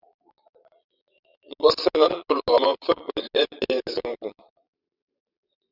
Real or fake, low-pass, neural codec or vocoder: fake; 5.4 kHz; vocoder, 22.05 kHz, 80 mel bands, Vocos